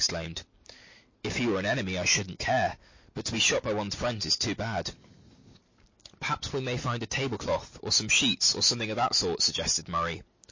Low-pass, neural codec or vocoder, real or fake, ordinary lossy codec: 7.2 kHz; none; real; MP3, 32 kbps